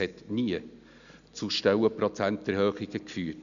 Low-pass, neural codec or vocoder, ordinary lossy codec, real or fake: 7.2 kHz; none; none; real